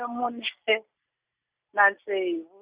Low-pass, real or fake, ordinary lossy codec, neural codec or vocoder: 3.6 kHz; real; Opus, 64 kbps; none